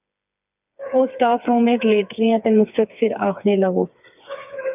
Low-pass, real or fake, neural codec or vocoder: 3.6 kHz; fake; codec, 16 kHz, 4 kbps, FreqCodec, smaller model